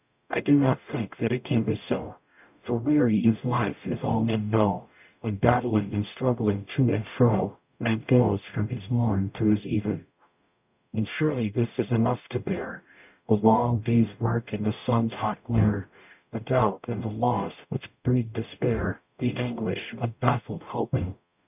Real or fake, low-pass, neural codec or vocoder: fake; 3.6 kHz; codec, 44.1 kHz, 0.9 kbps, DAC